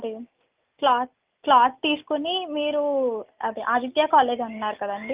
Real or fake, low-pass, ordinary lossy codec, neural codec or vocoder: real; 3.6 kHz; Opus, 24 kbps; none